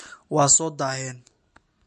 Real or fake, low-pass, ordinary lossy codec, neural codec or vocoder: real; 14.4 kHz; MP3, 48 kbps; none